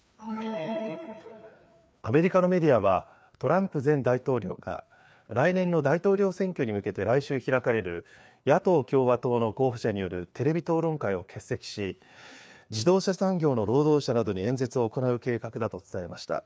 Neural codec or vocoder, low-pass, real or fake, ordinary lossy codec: codec, 16 kHz, 2 kbps, FreqCodec, larger model; none; fake; none